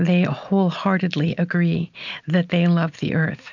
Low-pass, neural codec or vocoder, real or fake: 7.2 kHz; none; real